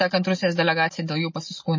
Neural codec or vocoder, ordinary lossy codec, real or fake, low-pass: none; MP3, 32 kbps; real; 7.2 kHz